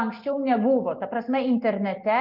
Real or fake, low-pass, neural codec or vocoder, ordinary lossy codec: real; 5.4 kHz; none; Opus, 24 kbps